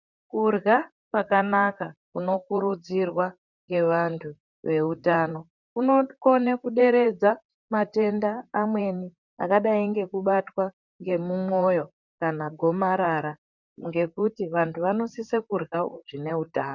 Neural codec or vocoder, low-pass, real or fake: vocoder, 44.1 kHz, 128 mel bands, Pupu-Vocoder; 7.2 kHz; fake